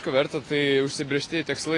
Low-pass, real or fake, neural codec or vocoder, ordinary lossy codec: 10.8 kHz; real; none; AAC, 32 kbps